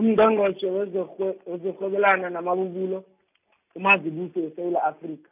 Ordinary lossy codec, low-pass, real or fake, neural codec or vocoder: none; 3.6 kHz; real; none